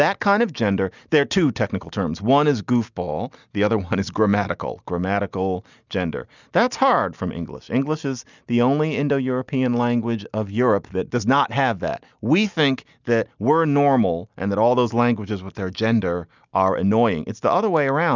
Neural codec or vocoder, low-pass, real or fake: none; 7.2 kHz; real